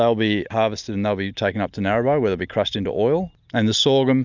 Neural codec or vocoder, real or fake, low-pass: none; real; 7.2 kHz